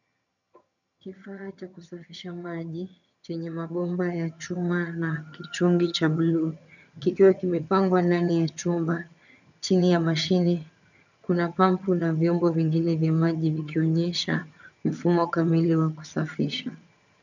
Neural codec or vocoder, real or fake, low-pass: vocoder, 22.05 kHz, 80 mel bands, HiFi-GAN; fake; 7.2 kHz